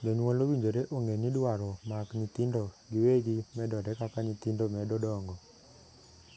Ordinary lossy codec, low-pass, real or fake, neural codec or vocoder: none; none; real; none